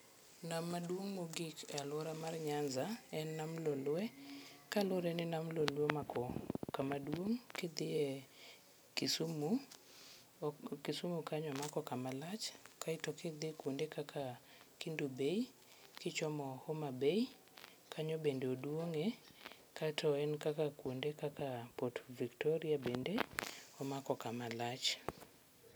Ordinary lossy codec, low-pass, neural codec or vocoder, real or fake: none; none; none; real